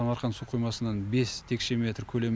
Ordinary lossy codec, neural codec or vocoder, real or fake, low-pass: none; none; real; none